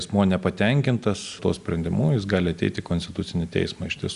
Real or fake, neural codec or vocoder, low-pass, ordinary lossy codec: real; none; 10.8 kHz; Opus, 64 kbps